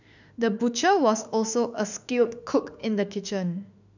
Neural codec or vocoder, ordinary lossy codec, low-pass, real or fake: autoencoder, 48 kHz, 32 numbers a frame, DAC-VAE, trained on Japanese speech; none; 7.2 kHz; fake